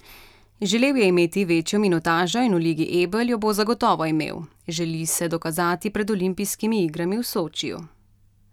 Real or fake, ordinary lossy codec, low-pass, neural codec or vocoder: real; none; 19.8 kHz; none